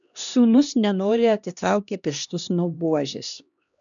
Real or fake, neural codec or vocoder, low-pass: fake; codec, 16 kHz, 1 kbps, X-Codec, HuBERT features, trained on LibriSpeech; 7.2 kHz